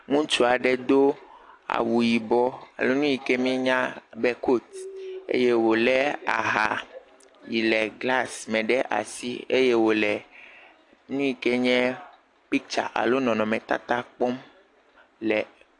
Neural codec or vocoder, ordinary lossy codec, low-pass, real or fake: none; AAC, 48 kbps; 10.8 kHz; real